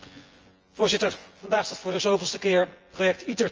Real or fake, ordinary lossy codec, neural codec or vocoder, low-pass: fake; Opus, 24 kbps; vocoder, 24 kHz, 100 mel bands, Vocos; 7.2 kHz